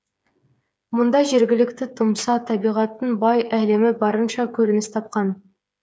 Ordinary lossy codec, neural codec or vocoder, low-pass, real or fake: none; codec, 16 kHz, 8 kbps, FreqCodec, smaller model; none; fake